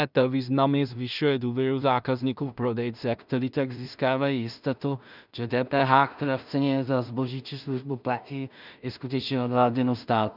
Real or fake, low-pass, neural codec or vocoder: fake; 5.4 kHz; codec, 16 kHz in and 24 kHz out, 0.4 kbps, LongCat-Audio-Codec, two codebook decoder